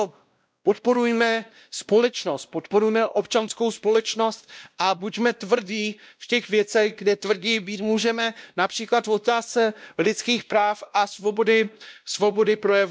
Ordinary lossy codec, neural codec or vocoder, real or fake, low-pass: none; codec, 16 kHz, 1 kbps, X-Codec, WavLM features, trained on Multilingual LibriSpeech; fake; none